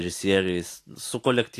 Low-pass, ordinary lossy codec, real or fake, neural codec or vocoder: 14.4 kHz; AAC, 64 kbps; real; none